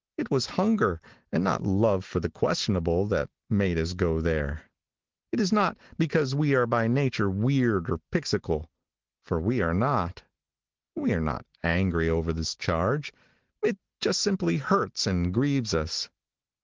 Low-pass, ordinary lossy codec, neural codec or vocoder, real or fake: 7.2 kHz; Opus, 16 kbps; none; real